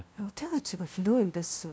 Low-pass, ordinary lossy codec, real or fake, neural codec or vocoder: none; none; fake; codec, 16 kHz, 0.5 kbps, FunCodec, trained on LibriTTS, 25 frames a second